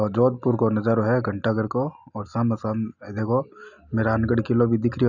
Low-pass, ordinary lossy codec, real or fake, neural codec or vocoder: 7.2 kHz; none; real; none